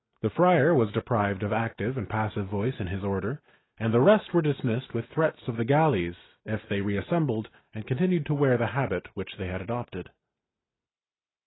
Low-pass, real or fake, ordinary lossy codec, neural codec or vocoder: 7.2 kHz; real; AAC, 16 kbps; none